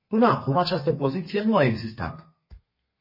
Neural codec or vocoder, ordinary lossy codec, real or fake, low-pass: codec, 32 kHz, 1.9 kbps, SNAC; MP3, 24 kbps; fake; 5.4 kHz